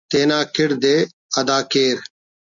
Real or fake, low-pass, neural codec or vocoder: real; 7.2 kHz; none